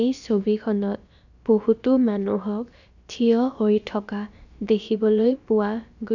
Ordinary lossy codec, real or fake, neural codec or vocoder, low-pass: none; fake; codec, 16 kHz, about 1 kbps, DyCAST, with the encoder's durations; 7.2 kHz